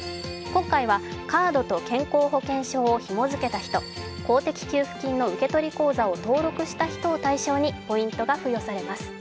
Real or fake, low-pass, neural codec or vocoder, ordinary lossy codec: real; none; none; none